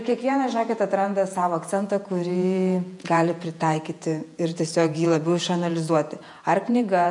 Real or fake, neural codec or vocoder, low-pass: fake; vocoder, 48 kHz, 128 mel bands, Vocos; 10.8 kHz